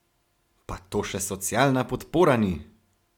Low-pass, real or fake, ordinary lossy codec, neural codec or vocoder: 19.8 kHz; real; MP3, 96 kbps; none